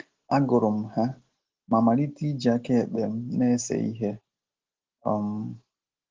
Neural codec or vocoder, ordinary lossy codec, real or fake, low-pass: none; Opus, 16 kbps; real; 7.2 kHz